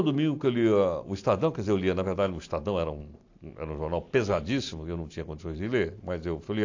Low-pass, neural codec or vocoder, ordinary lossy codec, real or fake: 7.2 kHz; none; AAC, 48 kbps; real